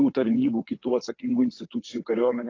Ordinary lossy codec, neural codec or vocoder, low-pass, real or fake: AAC, 32 kbps; vocoder, 22.05 kHz, 80 mel bands, WaveNeXt; 7.2 kHz; fake